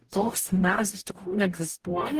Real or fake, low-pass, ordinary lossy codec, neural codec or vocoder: fake; 14.4 kHz; Opus, 24 kbps; codec, 44.1 kHz, 0.9 kbps, DAC